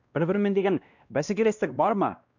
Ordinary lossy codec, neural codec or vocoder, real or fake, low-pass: none; codec, 16 kHz, 1 kbps, X-Codec, WavLM features, trained on Multilingual LibriSpeech; fake; 7.2 kHz